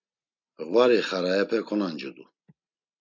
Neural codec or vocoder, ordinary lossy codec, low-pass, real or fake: none; MP3, 64 kbps; 7.2 kHz; real